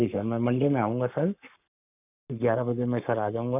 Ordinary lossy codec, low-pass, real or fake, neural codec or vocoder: none; 3.6 kHz; fake; codec, 44.1 kHz, 7.8 kbps, Pupu-Codec